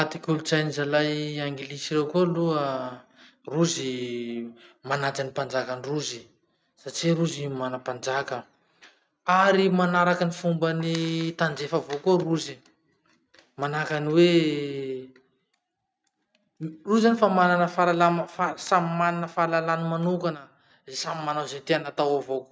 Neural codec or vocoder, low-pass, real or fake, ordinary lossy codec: none; none; real; none